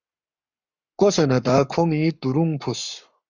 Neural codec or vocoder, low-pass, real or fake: codec, 44.1 kHz, 7.8 kbps, Pupu-Codec; 7.2 kHz; fake